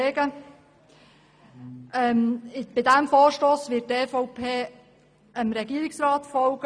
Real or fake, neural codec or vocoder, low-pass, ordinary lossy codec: real; none; 9.9 kHz; none